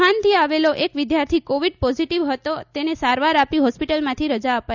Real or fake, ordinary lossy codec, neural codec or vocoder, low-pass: real; none; none; 7.2 kHz